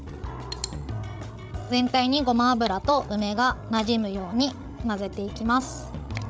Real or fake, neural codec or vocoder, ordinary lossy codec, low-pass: fake; codec, 16 kHz, 16 kbps, FreqCodec, larger model; none; none